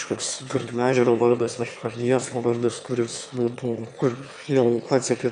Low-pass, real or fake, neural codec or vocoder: 9.9 kHz; fake; autoencoder, 22.05 kHz, a latent of 192 numbers a frame, VITS, trained on one speaker